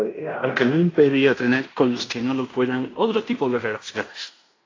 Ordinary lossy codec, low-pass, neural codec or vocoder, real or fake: AAC, 32 kbps; 7.2 kHz; codec, 16 kHz in and 24 kHz out, 0.9 kbps, LongCat-Audio-Codec, fine tuned four codebook decoder; fake